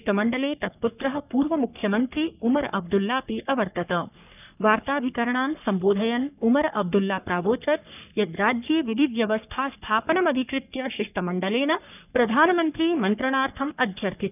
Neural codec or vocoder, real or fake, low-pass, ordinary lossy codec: codec, 44.1 kHz, 3.4 kbps, Pupu-Codec; fake; 3.6 kHz; none